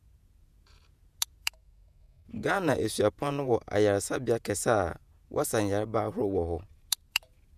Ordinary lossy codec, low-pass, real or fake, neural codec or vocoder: none; 14.4 kHz; fake; vocoder, 48 kHz, 128 mel bands, Vocos